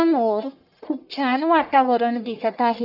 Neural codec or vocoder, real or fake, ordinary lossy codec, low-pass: codec, 44.1 kHz, 1.7 kbps, Pupu-Codec; fake; none; 5.4 kHz